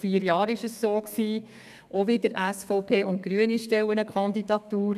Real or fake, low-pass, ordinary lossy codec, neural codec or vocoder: fake; 14.4 kHz; none; codec, 32 kHz, 1.9 kbps, SNAC